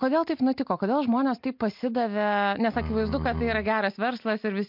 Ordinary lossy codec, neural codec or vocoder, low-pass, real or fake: MP3, 48 kbps; none; 5.4 kHz; real